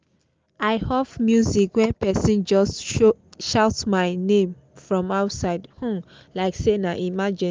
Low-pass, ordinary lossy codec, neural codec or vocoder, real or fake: 7.2 kHz; Opus, 24 kbps; none; real